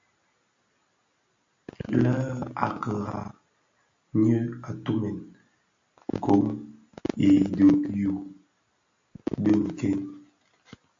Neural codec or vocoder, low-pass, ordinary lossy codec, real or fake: none; 7.2 kHz; AAC, 48 kbps; real